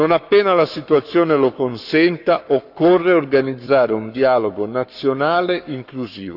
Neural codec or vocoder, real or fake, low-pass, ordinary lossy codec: codec, 44.1 kHz, 7.8 kbps, Pupu-Codec; fake; 5.4 kHz; none